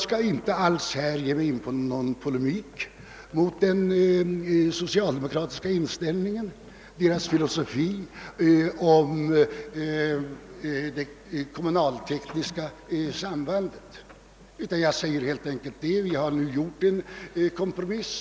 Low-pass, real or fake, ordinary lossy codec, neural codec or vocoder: none; real; none; none